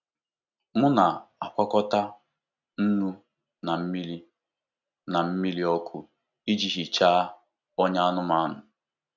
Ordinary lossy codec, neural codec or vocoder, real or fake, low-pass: none; none; real; 7.2 kHz